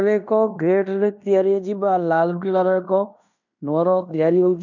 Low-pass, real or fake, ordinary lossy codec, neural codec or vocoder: 7.2 kHz; fake; none; codec, 16 kHz in and 24 kHz out, 0.9 kbps, LongCat-Audio-Codec, fine tuned four codebook decoder